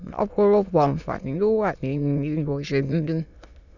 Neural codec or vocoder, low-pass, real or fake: autoencoder, 22.05 kHz, a latent of 192 numbers a frame, VITS, trained on many speakers; 7.2 kHz; fake